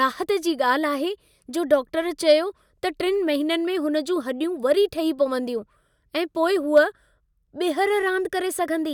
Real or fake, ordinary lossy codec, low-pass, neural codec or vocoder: real; none; 19.8 kHz; none